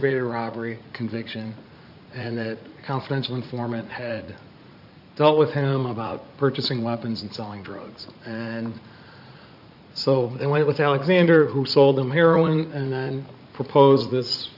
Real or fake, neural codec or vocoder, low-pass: fake; vocoder, 44.1 kHz, 80 mel bands, Vocos; 5.4 kHz